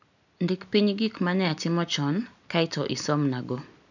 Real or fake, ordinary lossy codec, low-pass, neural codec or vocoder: real; none; 7.2 kHz; none